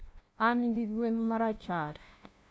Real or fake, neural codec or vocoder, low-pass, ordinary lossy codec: fake; codec, 16 kHz, 0.5 kbps, FunCodec, trained on LibriTTS, 25 frames a second; none; none